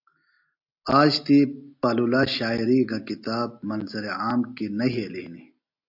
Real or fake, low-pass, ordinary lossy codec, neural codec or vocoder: real; 5.4 kHz; AAC, 48 kbps; none